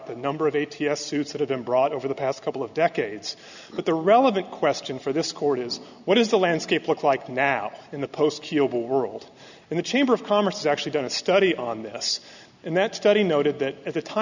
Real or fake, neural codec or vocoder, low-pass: real; none; 7.2 kHz